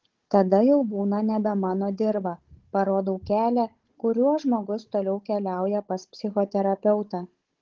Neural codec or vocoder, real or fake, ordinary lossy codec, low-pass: codec, 16 kHz, 16 kbps, FunCodec, trained on Chinese and English, 50 frames a second; fake; Opus, 16 kbps; 7.2 kHz